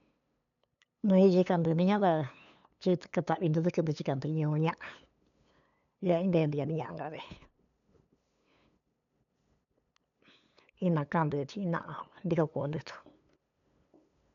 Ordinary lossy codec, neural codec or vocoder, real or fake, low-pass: none; codec, 16 kHz, 8 kbps, FunCodec, trained on LibriTTS, 25 frames a second; fake; 7.2 kHz